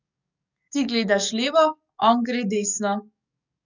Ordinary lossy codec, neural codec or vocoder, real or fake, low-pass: none; codec, 44.1 kHz, 7.8 kbps, DAC; fake; 7.2 kHz